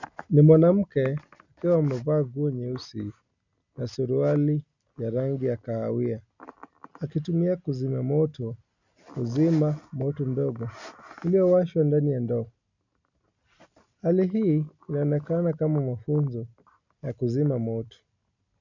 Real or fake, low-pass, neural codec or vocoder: real; 7.2 kHz; none